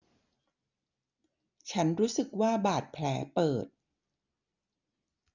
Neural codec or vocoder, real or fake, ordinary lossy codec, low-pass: none; real; none; 7.2 kHz